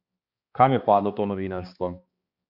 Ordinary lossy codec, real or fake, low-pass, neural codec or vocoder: Opus, 64 kbps; fake; 5.4 kHz; codec, 16 kHz, 2 kbps, X-Codec, HuBERT features, trained on balanced general audio